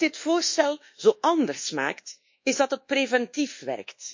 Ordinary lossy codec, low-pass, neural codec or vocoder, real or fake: MP3, 48 kbps; 7.2 kHz; codec, 24 kHz, 1.2 kbps, DualCodec; fake